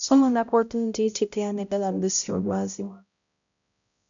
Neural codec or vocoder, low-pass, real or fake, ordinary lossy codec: codec, 16 kHz, 0.5 kbps, X-Codec, HuBERT features, trained on balanced general audio; 7.2 kHz; fake; MP3, 96 kbps